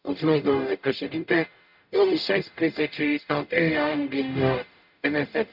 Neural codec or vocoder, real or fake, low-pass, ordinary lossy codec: codec, 44.1 kHz, 0.9 kbps, DAC; fake; 5.4 kHz; none